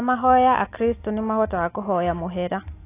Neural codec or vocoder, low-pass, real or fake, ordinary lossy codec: none; 3.6 kHz; real; AAC, 24 kbps